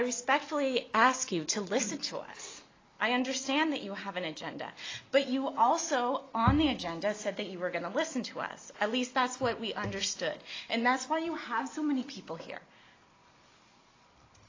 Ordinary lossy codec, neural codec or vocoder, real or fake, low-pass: AAC, 32 kbps; none; real; 7.2 kHz